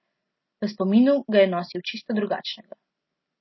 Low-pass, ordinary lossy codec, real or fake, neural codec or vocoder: 7.2 kHz; MP3, 24 kbps; real; none